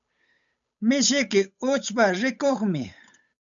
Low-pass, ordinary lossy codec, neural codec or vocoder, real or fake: 7.2 kHz; MP3, 96 kbps; codec, 16 kHz, 8 kbps, FunCodec, trained on Chinese and English, 25 frames a second; fake